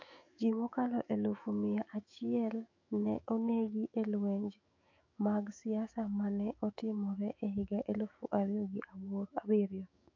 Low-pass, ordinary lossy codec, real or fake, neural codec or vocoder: 7.2 kHz; AAC, 48 kbps; fake; autoencoder, 48 kHz, 128 numbers a frame, DAC-VAE, trained on Japanese speech